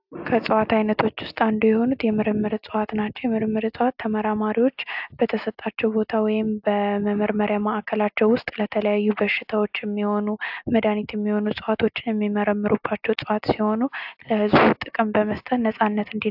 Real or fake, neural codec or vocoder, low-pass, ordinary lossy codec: real; none; 5.4 kHz; AAC, 48 kbps